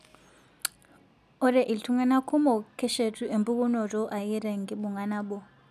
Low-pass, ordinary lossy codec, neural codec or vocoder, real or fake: 14.4 kHz; none; none; real